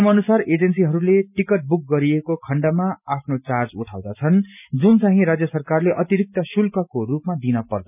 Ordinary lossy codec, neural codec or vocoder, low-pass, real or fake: none; none; 3.6 kHz; real